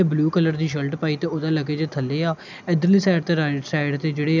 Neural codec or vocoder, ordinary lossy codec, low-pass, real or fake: none; none; 7.2 kHz; real